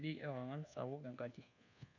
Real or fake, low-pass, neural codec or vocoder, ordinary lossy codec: fake; 7.2 kHz; codec, 24 kHz, 1.2 kbps, DualCodec; none